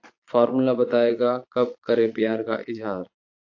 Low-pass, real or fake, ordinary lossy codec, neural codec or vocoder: 7.2 kHz; fake; AAC, 48 kbps; vocoder, 22.05 kHz, 80 mel bands, Vocos